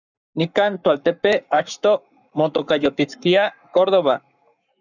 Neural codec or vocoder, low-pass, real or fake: codec, 44.1 kHz, 7.8 kbps, Pupu-Codec; 7.2 kHz; fake